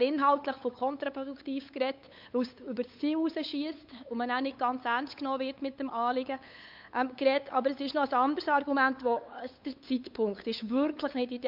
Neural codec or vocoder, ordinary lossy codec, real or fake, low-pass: codec, 16 kHz, 8 kbps, FunCodec, trained on LibriTTS, 25 frames a second; MP3, 48 kbps; fake; 5.4 kHz